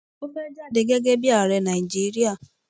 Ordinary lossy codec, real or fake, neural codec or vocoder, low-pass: none; real; none; none